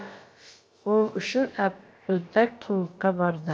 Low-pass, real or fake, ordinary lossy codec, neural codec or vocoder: none; fake; none; codec, 16 kHz, about 1 kbps, DyCAST, with the encoder's durations